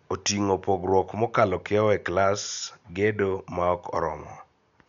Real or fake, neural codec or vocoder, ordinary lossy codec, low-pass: real; none; none; 7.2 kHz